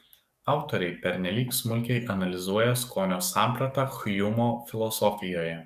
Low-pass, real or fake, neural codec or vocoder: 14.4 kHz; fake; codec, 44.1 kHz, 7.8 kbps, DAC